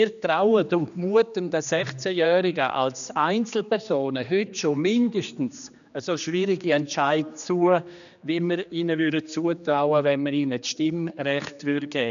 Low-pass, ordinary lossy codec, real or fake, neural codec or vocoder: 7.2 kHz; none; fake; codec, 16 kHz, 2 kbps, X-Codec, HuBERT features, trained on general audio